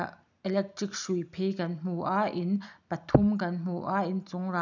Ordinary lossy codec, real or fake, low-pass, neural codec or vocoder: none; real; 7.2 kHz; none